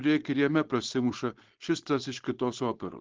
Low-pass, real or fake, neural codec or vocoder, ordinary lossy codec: 7.2 kHz; fake; codec, 16 kHz, 4.8 kbps, FACodec; Opus, 16 kbps